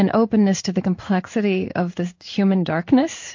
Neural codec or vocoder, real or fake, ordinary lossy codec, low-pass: codec, 16 kHz in and 24 kHz out, 1 kbps, XY-Tokenizer; fake; MP3, 48 kbps; 7.2 kHz